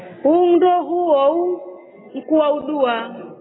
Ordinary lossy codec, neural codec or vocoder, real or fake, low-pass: AAC, 16 kbps; none; real; 7.2 kHz